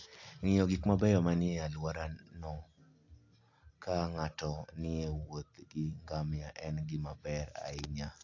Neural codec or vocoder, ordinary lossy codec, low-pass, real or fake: none; AAC, 48 kbps; 7.2 kHz; real